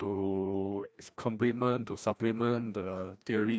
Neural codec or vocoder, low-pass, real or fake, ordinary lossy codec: codec, 16 kHz, 1 kbps, FreqCodec, larger model; none; fake; none